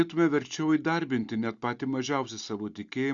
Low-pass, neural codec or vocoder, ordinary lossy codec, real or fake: 7.2 kHz; none; Opus, 64 kbps; real